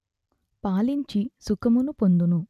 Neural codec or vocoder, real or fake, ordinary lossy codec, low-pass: none; real; none; 14.4 kHz